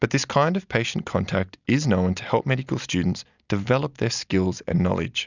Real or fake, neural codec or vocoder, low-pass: real; none; 7.2 kHz